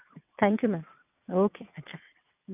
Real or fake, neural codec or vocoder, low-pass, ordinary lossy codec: fake; vocoder, 22.05 kHz, 80 mel bands, WaveNeXt; 3.6 kHz; none